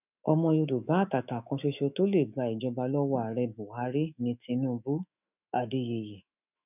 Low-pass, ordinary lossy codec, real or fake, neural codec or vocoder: 3.6 kHz; none; fake; autoencoder, 48 kHz, 128 numbers a frame, DAC-VAE, trained on Japanese speech